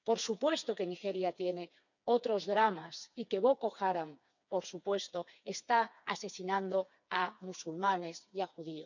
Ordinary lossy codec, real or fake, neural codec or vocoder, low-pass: none; fake; codec, 16 kHz, 4 kbps, FreqCodec, smaller model; 7.2 kHz